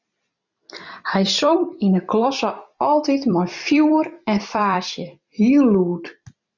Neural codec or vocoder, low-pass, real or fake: vocoder, 44.1 kHz, 128 mel bands every 256 samples, BigVGAN v2; 7.2 kHz; fake